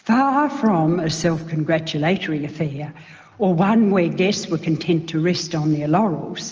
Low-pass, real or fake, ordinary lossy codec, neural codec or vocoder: 7.2 kHz; real; Opus, 24 kbps; none